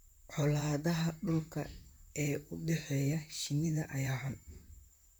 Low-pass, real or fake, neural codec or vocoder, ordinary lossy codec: none; fake; vocoder, 44.1 kHz, 128 mel bands, Pupu-Vocoder; none